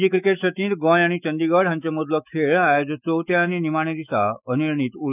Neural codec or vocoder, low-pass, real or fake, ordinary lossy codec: autoencoder, 48 kHz, 128 numbers a frame, DAC-VAE, trained on Japanese speech; 3.6 kHz; fake; none